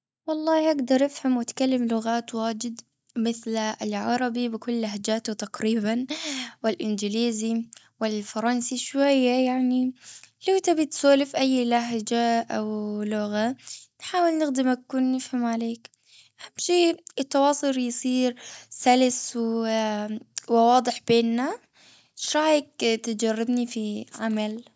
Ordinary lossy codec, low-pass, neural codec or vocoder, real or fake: none; none; none; real